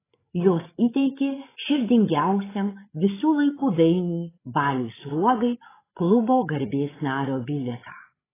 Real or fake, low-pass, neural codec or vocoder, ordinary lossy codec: fake; 3.6 kHz; codec, 16 kHz, 8 kbps, FreqCodec, larger model; AAC, 16 kbps